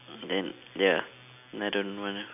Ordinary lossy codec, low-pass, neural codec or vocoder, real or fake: AAC, 32 kbps; 3.6 kHz; none; real